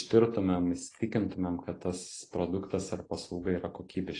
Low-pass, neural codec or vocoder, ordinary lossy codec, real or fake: 10.8 kHz; none; AAC, 32 kbps; real